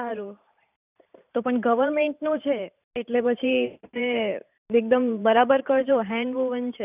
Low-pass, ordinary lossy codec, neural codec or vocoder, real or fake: 3.6 kHz; none; vocoder, 44.1 kHz, 128 mel bands every 512 samples, BigVGAN v2; fake